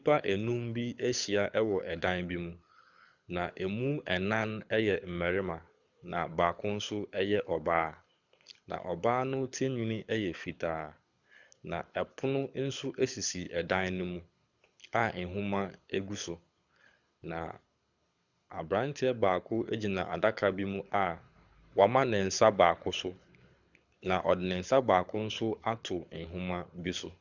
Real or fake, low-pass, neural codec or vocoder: fake; 7.2 kHz; codec, 24 kHz, 6 kbps, HILCodec